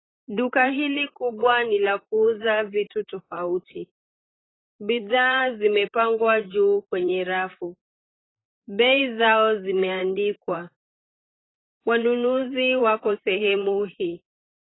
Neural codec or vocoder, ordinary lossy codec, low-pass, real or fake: vocoder, 44.1 kHz, 128 mel bands, Pupu-Vocoder; AAC, 16 kbps; 7.2 kHz; fake